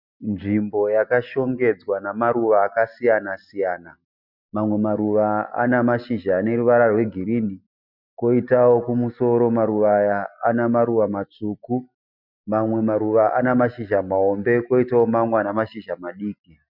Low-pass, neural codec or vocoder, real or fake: 5.4 kHz; none; real